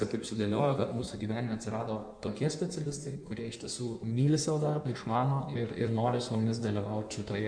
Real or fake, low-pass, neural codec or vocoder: fake; 9.9 kHz; codec, 16 kHz in and 24 kHz out, 1.1 kbps, FireRedTTS-2 codec